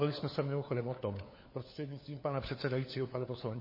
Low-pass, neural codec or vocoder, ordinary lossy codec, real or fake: 5.4 kHz; codec, 16 kHz, 4 kbps, FunCodec, trained on LibriTTS, 50 frames a second; MP3, 24 kbps; fake